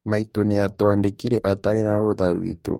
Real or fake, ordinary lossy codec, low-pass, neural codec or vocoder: fake; MP3, 64 kbps; 19.8 kHz; codec, 44.1 kHz, 2.6 kbps, DAC